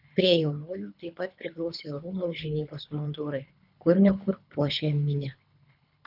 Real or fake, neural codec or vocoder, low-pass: fake; codec, 24 kHz, 3 kbps, HILCodec; 5.4 kHz